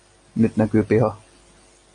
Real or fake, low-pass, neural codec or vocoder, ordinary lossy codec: real; 9.9 kHz; none; MP3, 48 kbps